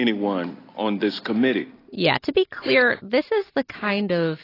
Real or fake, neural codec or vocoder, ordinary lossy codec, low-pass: real; none; AAC, 24 kbps; 5.4 kHz